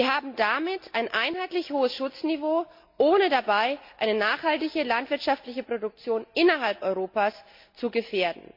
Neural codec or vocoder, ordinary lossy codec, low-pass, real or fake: none; MP3, 48 kbps; 5.4 kHz; real